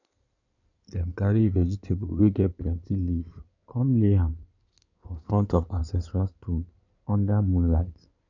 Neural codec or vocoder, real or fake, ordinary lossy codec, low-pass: codec, 16 kHz in and 24 kHz out, 2.2 kbps, FireRedTTS-2 codec; fake; none; 7.2 kHz